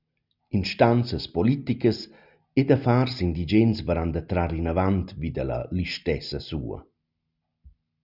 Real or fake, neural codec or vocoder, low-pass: real; none; 5.4 kHz